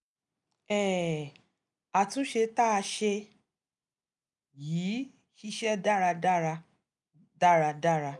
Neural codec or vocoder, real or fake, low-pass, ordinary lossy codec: none; real; 10.8 kHz; none